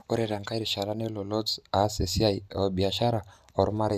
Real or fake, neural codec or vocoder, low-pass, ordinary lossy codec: real; none; 14.4 kHz; none